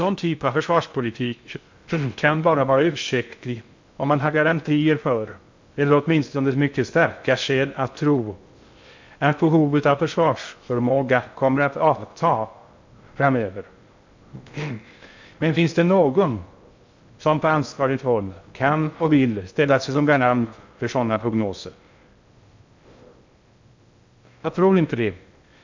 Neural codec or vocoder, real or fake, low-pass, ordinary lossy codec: codec, 16 kHz in and 24 kHz out, 0.6 kbps, FocalCodec, streaming, 2048 codes; fake; 7.2 kHz; MP3, 64 kbps